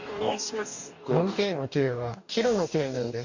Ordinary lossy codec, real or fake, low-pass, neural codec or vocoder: none; fake; 7.2 kHz; codec, 44.1 kHz, 2.6 kbps, DAC